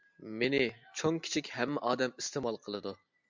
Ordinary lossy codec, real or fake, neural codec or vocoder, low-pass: MP3, 64 kbps; real; none; 7.2 kHz